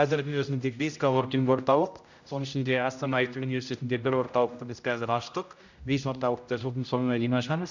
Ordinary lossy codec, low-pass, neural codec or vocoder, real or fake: none; 7.2 kHz; codec, 16 kHz, 0.5 kbps, X-Codec, HuBERT features, trained on general audio; fake